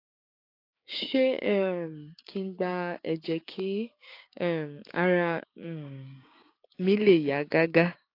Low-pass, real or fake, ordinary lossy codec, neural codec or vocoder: 5.4 kHz; fake; AAC, 32 kbps; codec, 16 kHz, 6 kbps, DAC